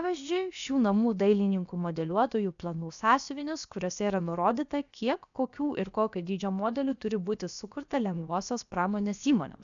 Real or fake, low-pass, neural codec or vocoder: fake; 7.2 kHz; codec, 16 kHz, about 1 kbps, DyCAST, with the encoder's durations